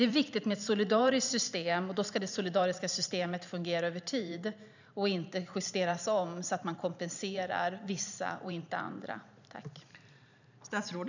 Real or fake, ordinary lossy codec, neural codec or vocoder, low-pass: fake; none; vocoder, 44.1 kHz, 128 mel bands every 512 samples, BigVGAN v2; 7.2 kHz